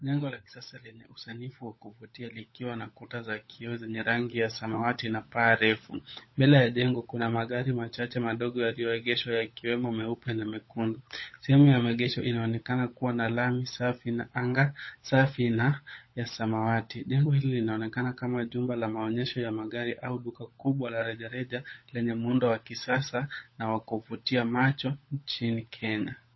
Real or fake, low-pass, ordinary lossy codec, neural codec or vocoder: fake; 7.2 kHz; MP3, 24 kbps; codec, 16 kHz, 16 kbps, FunCodec, trained on LibriTTS, 50 frames a second